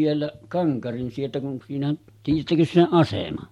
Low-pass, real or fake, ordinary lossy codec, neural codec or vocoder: 19.8 kHz; real; MP3, 64 kbps; none